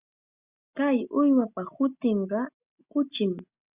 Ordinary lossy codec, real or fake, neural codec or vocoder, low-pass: Opus, 64 kbps; real; none; 3.6 kHz